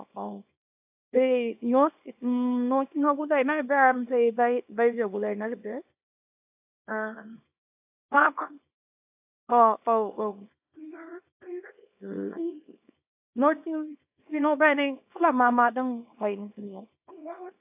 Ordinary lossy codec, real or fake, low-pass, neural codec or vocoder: AAC, 32 kbps; fake; 3.6 kHz; codec, 24 kHz, 0.9 kbps, WavTokenizer, small release